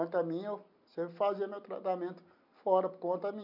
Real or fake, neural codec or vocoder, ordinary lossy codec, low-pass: real; none; MP3, 48 kbps; 5.4 kHz